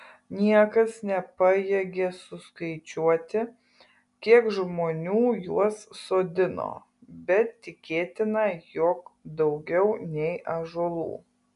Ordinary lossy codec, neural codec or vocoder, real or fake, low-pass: MP3, 96 kbps; none; real; 10.8 kHz